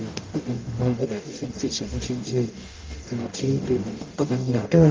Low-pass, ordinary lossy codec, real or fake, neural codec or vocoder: 7.2 kHz; Opus, 32 kbps; fake; codec, 44.1 kHz, 0.9 kbps, DAC